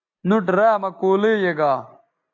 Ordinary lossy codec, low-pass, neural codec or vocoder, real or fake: AAC, 48 kbps; 7.2 kHz; none; real